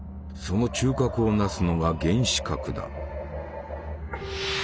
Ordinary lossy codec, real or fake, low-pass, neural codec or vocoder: none; real; none; none